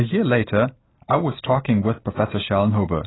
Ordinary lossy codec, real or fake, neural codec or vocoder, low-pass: AAC, 16 kbps; real; none; 7.2 kHz